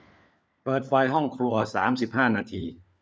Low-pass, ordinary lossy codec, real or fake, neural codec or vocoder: none; none; fake; codec, 16 kHz, 4 kbps, FunCodec, trained on LibriTTS, 50 frames a second